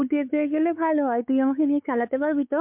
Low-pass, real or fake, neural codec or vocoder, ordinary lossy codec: 3.6 kHz; fake; codec, 16 kHz, 4 kbps, X-Codec, WavLM features, trained on Multilingual LibriSpeech; MP3, 32 kbps